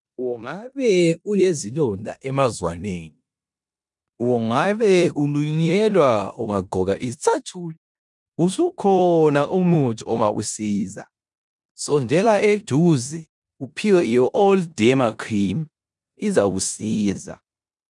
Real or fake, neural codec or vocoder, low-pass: fake; codec, 16 kHz in and 24 kHz out, 0.9 kbps, LongCat-Audio-Codec, four codebook decoder; 10.8 kHz